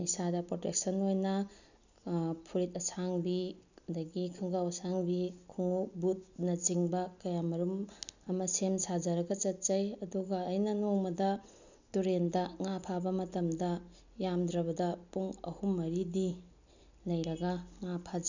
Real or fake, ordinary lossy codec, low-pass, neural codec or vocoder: real; none; 7.2 kHz; none